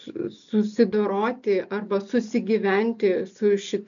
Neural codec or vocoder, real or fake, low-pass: none; real; 7.2 kHz